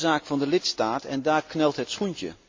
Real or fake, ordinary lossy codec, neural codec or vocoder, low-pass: real; MP3, 48 kbps; none; 7.2 kHz